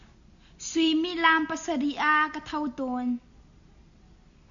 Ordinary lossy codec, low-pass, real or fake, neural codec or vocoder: MP3, 96 kbps; 7.2 kHz; real; none